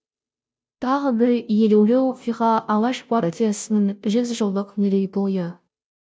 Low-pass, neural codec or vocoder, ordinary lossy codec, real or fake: none; codec, 16 kHz, 0.5 kbps, FunCodec, trained on Chinese and English, 25 frames a second; none; fake